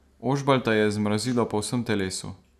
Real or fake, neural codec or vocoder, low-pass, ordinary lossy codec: real; none; 14.4 kHz; none